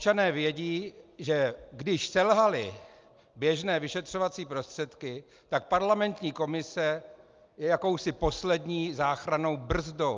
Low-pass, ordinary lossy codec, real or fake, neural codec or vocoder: 7.2 kHz; Opus, 24 kbps; real; none